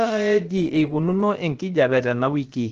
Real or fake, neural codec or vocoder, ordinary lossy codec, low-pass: fake; codec, 16 kHz, about 1 kbps, DyCAST, with the encoder's durations; Opus, 16 kbps; 7.2 kHz